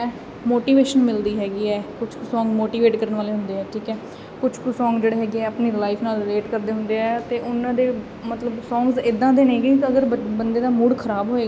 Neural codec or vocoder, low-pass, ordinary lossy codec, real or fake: none; none; none; real